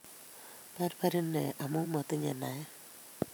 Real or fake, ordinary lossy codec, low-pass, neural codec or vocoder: fake; none; none; vocoder, 44.1 kHz, 128 mel bands every 512 samples, BigVGAN v2